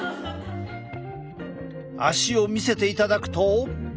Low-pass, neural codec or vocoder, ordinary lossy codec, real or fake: none; none; none; real